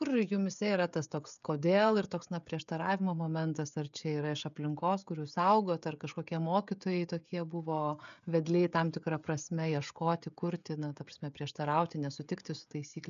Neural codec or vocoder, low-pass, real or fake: codec, 16 kHz, 16 kbps, FreqCodec, smaller model; 7.2 kHz; fake